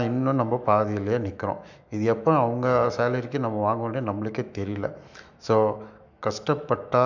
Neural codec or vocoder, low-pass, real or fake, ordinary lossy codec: autoencoder, 48 kHz, 128 numbers a frame, DAC-VAE, trained on Japanese speech; 7.2 kHz; fake; none